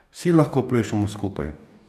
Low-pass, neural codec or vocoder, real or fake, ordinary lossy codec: 14.4 kHz; codec, 44.1 kHz, 2.6 kbps, DAC; fake; none